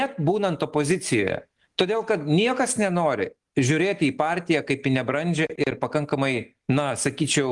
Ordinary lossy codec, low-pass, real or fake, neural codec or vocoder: Opus, 24 kbps; 10.8 kHz; real; none